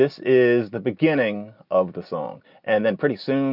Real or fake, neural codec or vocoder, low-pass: real; none; 5.4 kHz